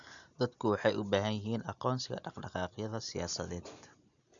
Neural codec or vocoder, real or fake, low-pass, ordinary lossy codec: none; real; 7.2 kHz; none